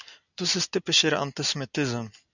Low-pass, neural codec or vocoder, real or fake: 7.2 kHz; none; real